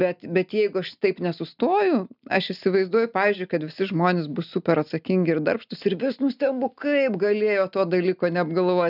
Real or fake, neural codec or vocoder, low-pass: real; none; 5.4 kHz